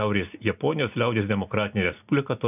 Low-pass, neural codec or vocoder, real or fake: 3.6 kHz; none; real